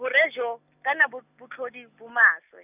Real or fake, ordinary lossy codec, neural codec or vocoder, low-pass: real; none; none; 3.6 kHz